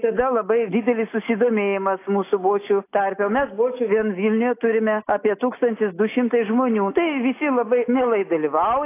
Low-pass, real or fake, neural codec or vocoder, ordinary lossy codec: 3.6 kHz; real; none; AAC, 24 kbps